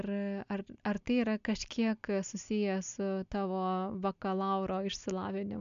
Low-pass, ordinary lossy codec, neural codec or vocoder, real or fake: 7.2 kHz; MP3, 64 kbps; none; real